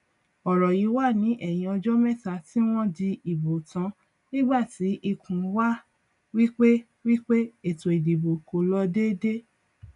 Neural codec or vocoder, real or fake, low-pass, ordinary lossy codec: none; real; 10.8 kHz; none